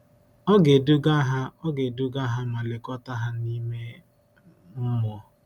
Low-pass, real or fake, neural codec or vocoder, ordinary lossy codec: 19.8 kHz; real; none; none